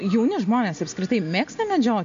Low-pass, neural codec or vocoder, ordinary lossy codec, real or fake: 7.2 kHz; none; MP3, 48 kbps; real